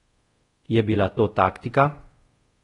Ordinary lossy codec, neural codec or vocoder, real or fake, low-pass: AAC, 32 kbps; codec, 24 kHz, 0.5 kbps, DualCodec; fake; 10.8 kHz